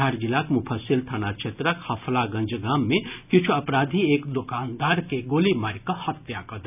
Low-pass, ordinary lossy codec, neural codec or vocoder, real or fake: 3.6 kHz; none; none; real